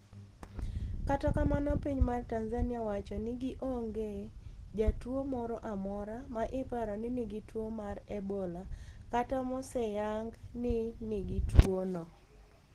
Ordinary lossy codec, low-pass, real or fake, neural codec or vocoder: Opus, 16 kbps; 14.4 kHz; real; none